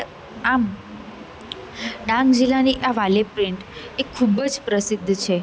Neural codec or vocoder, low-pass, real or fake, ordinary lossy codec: none; none; real; none